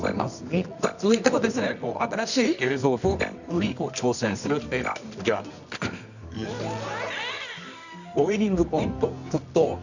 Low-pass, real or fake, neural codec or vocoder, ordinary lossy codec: 7.2 kHz; fake; codec, 24 kHz, 0.9 kbps, WavTokenizer, medium music audio release; none